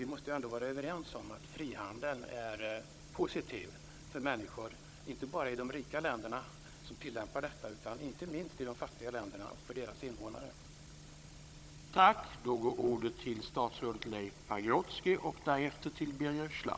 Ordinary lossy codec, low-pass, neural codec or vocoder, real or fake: none; none; codec, 16 kHz, 16 kbps, FunCodec, trained on Chinese and English, 50 frames a second; fake